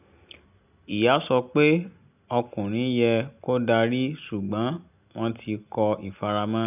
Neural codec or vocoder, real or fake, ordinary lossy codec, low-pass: none; real; none; 3.6 kHz